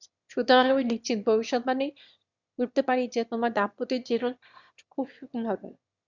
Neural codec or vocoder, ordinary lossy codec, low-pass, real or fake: autoencoder, 22.05 kHz, a latent of 192 numbers a frame, VITS, trained on one speaker; Opus, 64 kbps; 7.2 kHz; fake